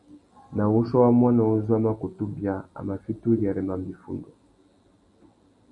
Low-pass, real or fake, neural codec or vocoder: 10.8 kHz; fake; vocoder, 44.1 kHz, 128 mel bands every 256 samples, BigVGAN v2